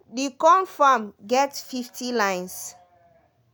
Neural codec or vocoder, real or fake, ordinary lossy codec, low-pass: none; real; none; none